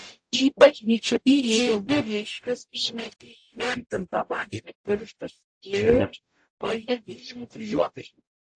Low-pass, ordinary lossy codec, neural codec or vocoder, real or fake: 9.9 kHz; AAC, 64 kbps; codec, 44.1 kHz, 0.9 kbps, DAC; fake